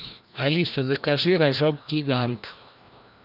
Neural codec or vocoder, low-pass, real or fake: codec, 16 kHz, 1 kbps, FreqCodec, larger model; 5.4 kHz; fake